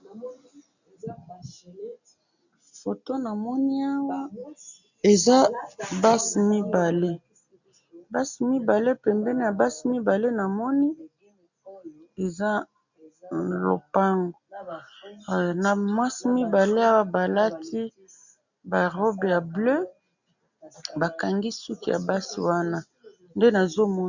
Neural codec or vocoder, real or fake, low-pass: none; real; 7.2 kHz